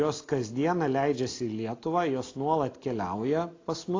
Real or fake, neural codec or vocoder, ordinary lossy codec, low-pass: real; none; AAC, 32 kbps; 7.2 kHz